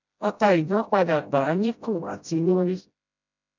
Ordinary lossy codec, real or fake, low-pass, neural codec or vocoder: none; fake; 7.2 kHz; codec, 16 kHz, 0.5 kbps, FreqCodec, smaller model